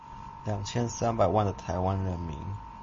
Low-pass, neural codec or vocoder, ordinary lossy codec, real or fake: 7.2 kHz; none; MP3, 32 kbps; real